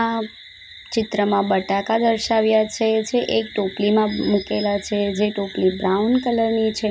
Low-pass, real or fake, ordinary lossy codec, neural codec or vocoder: none; real; none; none